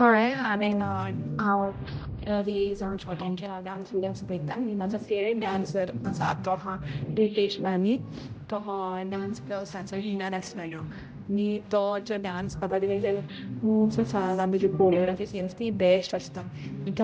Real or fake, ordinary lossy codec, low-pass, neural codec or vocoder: fake; none; none; codec, 16 kHz, 0.5 kbps, X-Codec, HuBERT features, trained on general audio